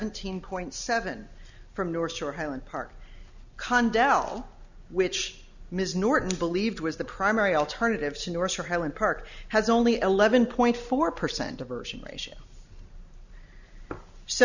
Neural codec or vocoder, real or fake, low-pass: none; real; 7.2 kHz